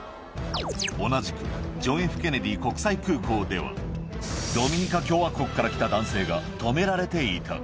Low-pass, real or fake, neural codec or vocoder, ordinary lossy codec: none; real; none; none